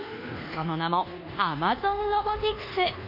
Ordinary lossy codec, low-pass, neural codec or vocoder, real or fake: none; 5.4 kHz; codec, 24 kHz, 1.2 kbps, DualCodec; fake